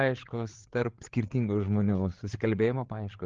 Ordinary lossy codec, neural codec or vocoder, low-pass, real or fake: Opus, 16 kbps; codec, 16 kHz, 8 kbps, FreqCodec, larger model; 7.2 kHz; fake